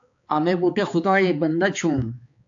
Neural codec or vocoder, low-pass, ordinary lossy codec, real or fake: codec, 16 kHz, 4 kbps, X-Codec, HuBERT features, trained on balanced general audio; 7.2 kHz; AAC, 64 kbps; fake